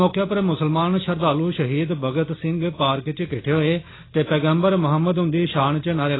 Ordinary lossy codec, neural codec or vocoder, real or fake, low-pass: AAC, 16 kbps; none; real; 7.2 kHz